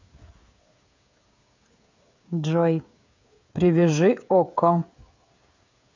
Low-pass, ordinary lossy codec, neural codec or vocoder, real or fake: 7.2 kHz; MP3, 64 kbps; codec, 16 kHz, 8 kbps, FreqCodec, smaller model; fake